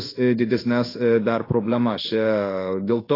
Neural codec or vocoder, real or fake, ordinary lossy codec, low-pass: codec, 16 kHz in and 24 kHz out, 1 kbps, XY-Tokenizer; fake; AAC, 24 kbps; 5.4 kHz